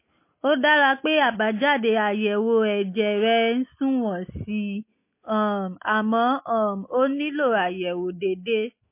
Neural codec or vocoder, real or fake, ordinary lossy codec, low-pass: none; real; MP3, 24 kbps; 3.6 kHz